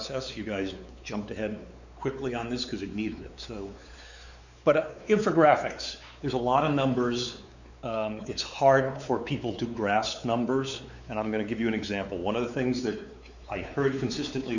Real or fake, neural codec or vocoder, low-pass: fake; codec, 16 kHz, 4 kbps, X-Codec, WavLM features, trained on Multilingual LibriSpeech; 7.2 kHz